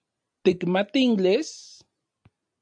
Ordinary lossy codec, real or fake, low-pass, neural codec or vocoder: AAC, 64 kbps; real; 9.9 kHz; none